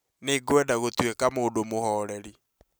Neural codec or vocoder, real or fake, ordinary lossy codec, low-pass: none; real; none; none